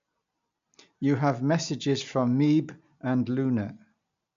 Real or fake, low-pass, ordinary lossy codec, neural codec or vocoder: real; 7.2 kHz; MP3, 64 kbps; none